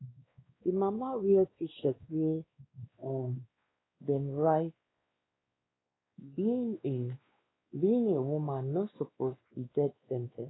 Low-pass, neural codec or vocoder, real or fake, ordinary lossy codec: 7.2 kHz; codec, 16 kHz, 2 kbps, X-Codec, WavLM features, trained on Multilingual LibriSpeech; fake; AAC, 16 kbps